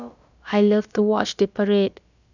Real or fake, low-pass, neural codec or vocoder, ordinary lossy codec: fake; 7.2 kHz; codec, 16 kHz, about 1 kbps, DyCAST, with the encoder's durations; none